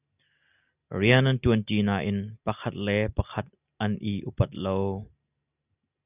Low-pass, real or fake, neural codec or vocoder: 3.6 kHz; real; none